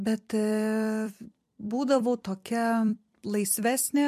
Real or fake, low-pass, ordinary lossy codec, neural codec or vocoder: fake; 14.4 kHz; MP3, 64 kbps; vocoder, 44.1 kHz, 128 mel bands every 256 samples, BigVGAN v2